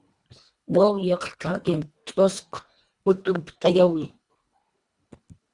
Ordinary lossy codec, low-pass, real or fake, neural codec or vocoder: Opus, 64 kbps; 10.8 kHz; fake; codec, 24 kHz, 1.5 kbps, HILCodec